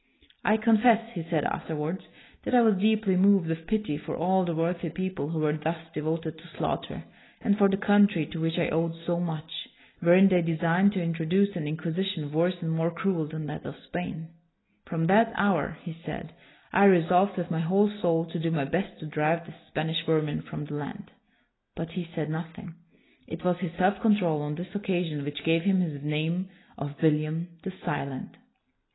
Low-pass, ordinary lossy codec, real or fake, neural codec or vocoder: 7.2 kHz; AAC, 16 kbps; real; none